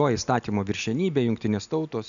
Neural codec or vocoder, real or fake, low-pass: none; real; 7.2 kHz